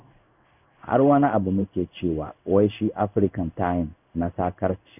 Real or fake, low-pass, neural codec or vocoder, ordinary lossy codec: fake; 3.6 kHz; codec, 16 kHz in and 24 kHz out, 1 kbps, XY-Tokenizer; none